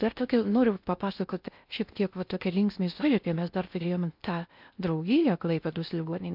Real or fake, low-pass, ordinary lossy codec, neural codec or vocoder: fake; 5.4 kHz; MP3, 48 kbps; codec, 16 kHz in and 24 kHz out, 0.6 kbps, FocalCodec, streaming, 4096 codes